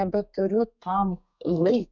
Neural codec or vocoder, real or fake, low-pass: codec, 32 kHz, 1.9 kbps, SNAC; fake; 7.2 kHz